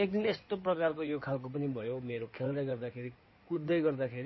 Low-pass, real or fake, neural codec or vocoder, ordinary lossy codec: 7.2 kHz; fake; codec, 16 kHz in and 24 kHz out, 2.2 kbps, FireRedTTS-2 codec; MP3, 24 kbps